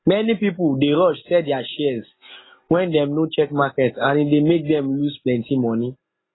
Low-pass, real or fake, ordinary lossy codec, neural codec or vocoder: 7.2 kHz; real; AAC, 16 kbps; none